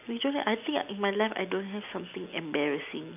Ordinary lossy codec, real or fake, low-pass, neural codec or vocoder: none; real; 3.6 kHz; none